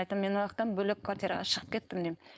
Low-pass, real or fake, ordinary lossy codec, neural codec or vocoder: none; fake; none; codec, 16 kHz, 4.8 kbps, FACodec